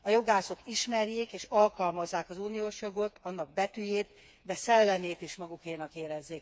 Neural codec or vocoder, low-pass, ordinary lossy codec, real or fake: codec, 16 kHz, 4 kbps, FreqCodec, smaller model; none; none; fake